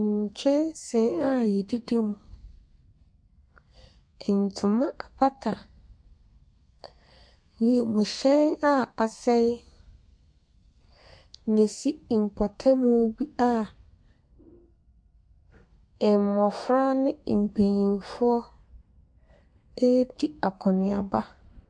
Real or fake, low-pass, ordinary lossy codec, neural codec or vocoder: fake; 9.9 kHz; MP3, 64 kbps; codec, 32 kHz, 1.9 kbps, SNAC